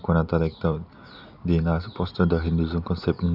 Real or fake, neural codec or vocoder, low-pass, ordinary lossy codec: real; none; 5.4 kHz; none